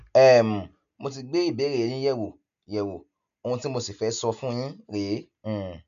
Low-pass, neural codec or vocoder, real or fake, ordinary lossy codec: 7.2 kHz; none; real; none